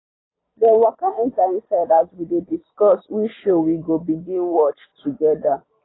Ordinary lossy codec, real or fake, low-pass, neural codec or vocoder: AAC, 16 kbps; real; 7.2 kHz; none